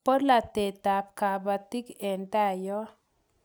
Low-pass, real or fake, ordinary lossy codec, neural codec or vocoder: none; real; none; none